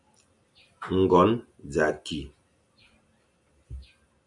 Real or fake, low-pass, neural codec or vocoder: real; 10.8 kHz; none